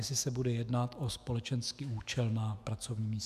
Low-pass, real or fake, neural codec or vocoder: 14.4 kHz; fake; autoencoder, 48 kHz, 128 numbers a frame, DAC-VAE, trained on Japanese speech